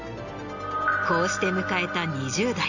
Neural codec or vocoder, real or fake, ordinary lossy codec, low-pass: none; real; none; 7.2 kHz